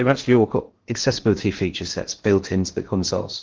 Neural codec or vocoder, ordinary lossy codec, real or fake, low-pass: codec, 16 kHz in and 24 kHz out, 0.6 kbps, FocalCodec, streaming, 2048 codes; Opus, 16 kbps; fake; 7.2 kHz